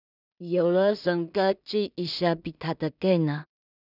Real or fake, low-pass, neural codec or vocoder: fake; 5.4 kHz; codec, 16 kHz in and 24 kHz out, 0.4 kbps, LongCat-Audio-Codec, two codebook decoder